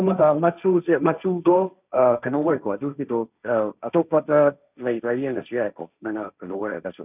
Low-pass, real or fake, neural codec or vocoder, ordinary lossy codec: 3.6 kHz; fake; codec, 16 kHz, 1.1 kbps, Voila-Tokenizer; none